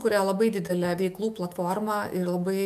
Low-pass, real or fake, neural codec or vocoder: 14.4 kHz; fake; autoencoder, 48 kHz, 128 numbers a frame, DAC-VAE, trained on Japanese speech